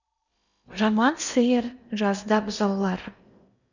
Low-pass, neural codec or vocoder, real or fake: 7.2 kHz; codec, 16 kHz in and 24 kHz out, 0.8 kbps, FocalCodec, streaming, 65536 codes; fake